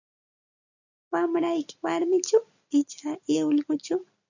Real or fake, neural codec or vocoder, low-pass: real; none; 7.2 kHz